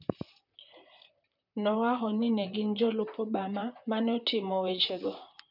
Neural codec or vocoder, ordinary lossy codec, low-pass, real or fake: vocoder, 44.1 kHz, 128 mel bands, Pupu-Vocoder; none; 5.4 kHz; fake